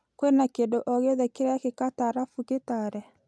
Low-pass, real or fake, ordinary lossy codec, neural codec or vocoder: none; real; none; none